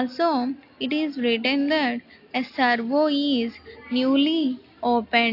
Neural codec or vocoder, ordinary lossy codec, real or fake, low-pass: none; AAC, 32 kbps; real; 5.4 kHz